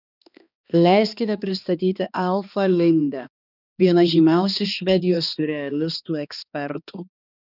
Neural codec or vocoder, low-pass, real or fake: codec, 16 kHz, 2 kbps, X-Codec, HuBERT features, trained on balanced general audio; 5.4 kHz; fake